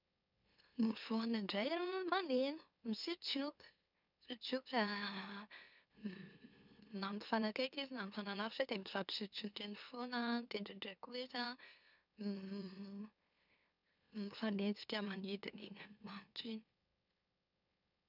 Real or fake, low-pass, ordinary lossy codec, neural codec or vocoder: fake; 5.4 kHz; none; autoencoder, 44.1 kHz, a latent of 192 numbers a frame, MeloTTS